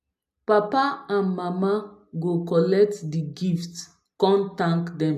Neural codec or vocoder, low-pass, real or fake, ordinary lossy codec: none; 14.4 kHz; real; AAC, 96 kbps